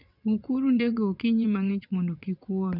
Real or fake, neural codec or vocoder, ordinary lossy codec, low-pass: fake; vocoder, 22.05 kHz, 80 mel bands, WaveNeXt; none; 5.4 kHz